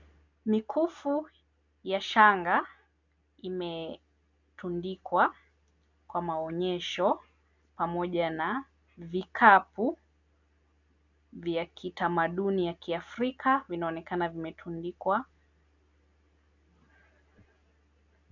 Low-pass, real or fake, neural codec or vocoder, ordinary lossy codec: 7.2 kHz; real; none; MP3, 64 kbps